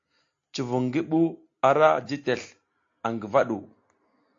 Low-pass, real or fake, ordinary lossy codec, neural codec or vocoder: 7.2 kHz; real; AAC, 48 kbps; none